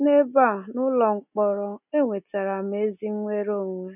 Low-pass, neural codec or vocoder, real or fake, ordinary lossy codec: 3.6 kHz; none; real; none